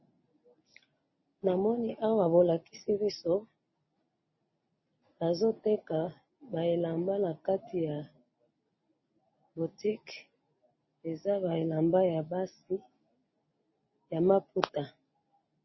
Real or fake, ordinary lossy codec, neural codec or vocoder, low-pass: real; MP3, 24 kbps; none; 7.2 kHz